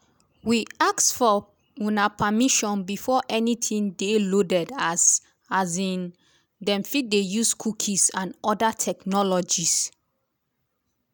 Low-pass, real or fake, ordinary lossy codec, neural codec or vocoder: none; real; none; none